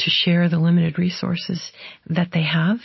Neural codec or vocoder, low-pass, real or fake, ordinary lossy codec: none; 7.2 kHz; real; MP3, 24 kbps